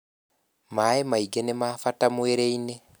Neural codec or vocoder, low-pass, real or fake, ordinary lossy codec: none; none; real; none